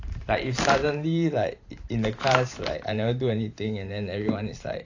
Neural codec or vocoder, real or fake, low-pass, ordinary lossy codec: vocoder, 44.1 kHz, 128 mel bands every 512 samples, BigVGAN v2; fake; 7.2 kHz; AAC, 48 kbps